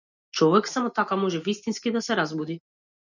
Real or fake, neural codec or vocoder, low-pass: real; none; 7.2 kHz